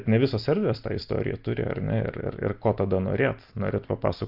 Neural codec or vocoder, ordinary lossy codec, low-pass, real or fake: none; Opus, 24 kbps; 5.4 kHz; real